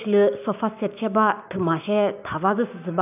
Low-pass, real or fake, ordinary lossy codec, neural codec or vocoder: 3.6 kHz; fake; none; codec, 16 kHz, 6 kbps, DAC